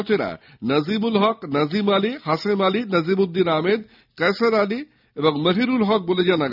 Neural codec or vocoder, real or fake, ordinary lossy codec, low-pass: none; real; none; 5.4 kHz